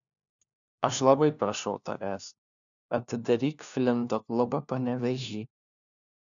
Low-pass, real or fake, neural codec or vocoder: 7.2 kHz; fake; codec, 16 kHz, 1 kbps, FunCodec, trained on LibriTTS, 50 frames a second